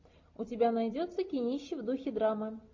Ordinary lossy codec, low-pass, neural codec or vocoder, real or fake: MP3, 48 kbps; 7.2 kHz; none; real